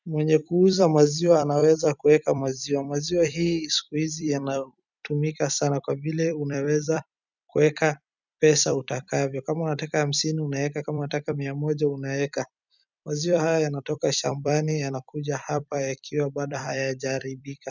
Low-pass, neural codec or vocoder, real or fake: 7.2 kHz; vocoder, 44.1 kHz, 128 mel bands every 256 samples, BigVGAN v2; fake